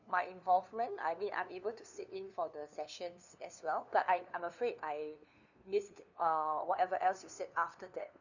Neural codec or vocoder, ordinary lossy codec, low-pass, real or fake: codec, 16 kHz, 2 kbps, FunCodec, trained on LibriTTS, 25 frames a second; none; 7.2 kHz; fake